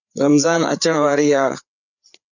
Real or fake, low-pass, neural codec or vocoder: fake; 7.2 kHz; codec, 16 kHz, 4 kbps, FreqCodec, larger model